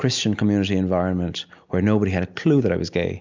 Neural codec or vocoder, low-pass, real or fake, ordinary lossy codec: none; 7.2 kHz; real; MP3, 64 kbps